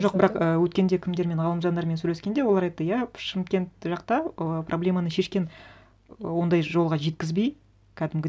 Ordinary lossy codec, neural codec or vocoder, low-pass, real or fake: none; none; none; real